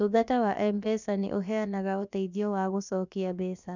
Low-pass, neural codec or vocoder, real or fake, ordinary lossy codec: 7.2 kHz; codec, 16 kHz, about 1 kbps, DyCAST, with the encoder's durations; fake; none